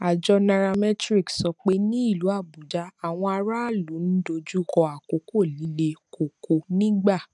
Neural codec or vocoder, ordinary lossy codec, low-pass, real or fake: none; none; 10.8 kHz; real